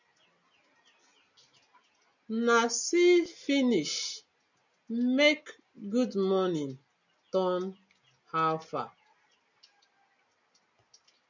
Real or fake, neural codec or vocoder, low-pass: real; none; 7.2 kHz